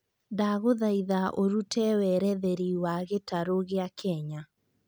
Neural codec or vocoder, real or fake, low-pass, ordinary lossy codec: none; real; none; none